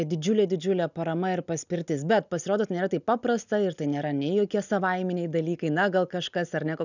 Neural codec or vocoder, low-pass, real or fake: none; 7.2 kHz; real